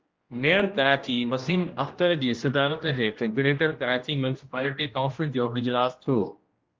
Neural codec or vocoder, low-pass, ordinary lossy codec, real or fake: codec, 16 kHz, 1 kbps, X-Codec, HuBERT features, trained on general audio; 7.2 kHz; Opus, 24 kbps; fake